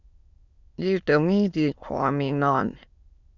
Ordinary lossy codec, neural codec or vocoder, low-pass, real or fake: Opus, 64 kbps; autoencoder, 22.05 kHz, a latent of 192 numbers a frame, VITS, trained on many speakers; 7.2 kHz; fake